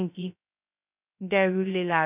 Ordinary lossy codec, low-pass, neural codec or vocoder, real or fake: none; 3.6 kHz; codec, 16 kHz, 0.2 kbps, FocalCodec; fake